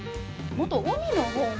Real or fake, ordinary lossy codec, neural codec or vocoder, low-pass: real; none; none; none